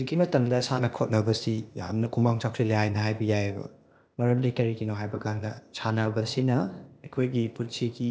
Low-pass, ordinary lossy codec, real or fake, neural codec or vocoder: none; none; fake; codec, 16 kHz, 0.8 kbps, ZipCodec